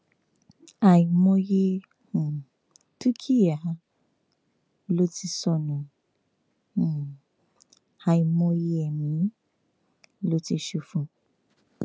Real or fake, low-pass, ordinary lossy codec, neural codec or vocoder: real; none; none; none